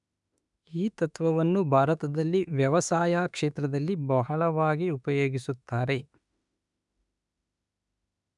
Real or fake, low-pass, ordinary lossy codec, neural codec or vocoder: fake; 10.8 kHz; none; autoencoder, 48 kHz, 32 numbers a frame, DAC-VAE, trained on Japanese speech